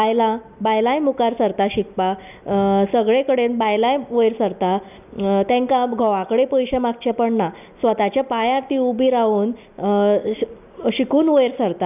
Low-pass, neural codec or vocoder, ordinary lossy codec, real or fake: 3.6 kHz; none; none; real